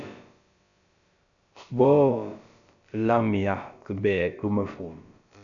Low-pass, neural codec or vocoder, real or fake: 7.2 kHz; codec, 16 kHz, about 1 kbps, DyCAST, with the encoder's durations; fake